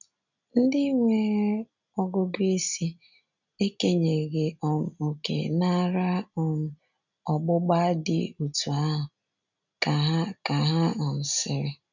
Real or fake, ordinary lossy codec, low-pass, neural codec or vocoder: real; none; 7.2 kHz; none